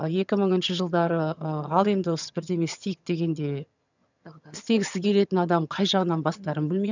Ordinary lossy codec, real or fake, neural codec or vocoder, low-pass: none; fake; vocoder, 22.05 kHz, 80 mel bands, HiFi-GAN; 7.2 kHz